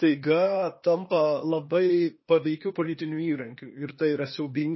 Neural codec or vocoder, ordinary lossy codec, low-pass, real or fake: codec, 16 kHz, 0.8 kbps, ZipCodec; MP3, 24 kbps; 7.2 kHz; fake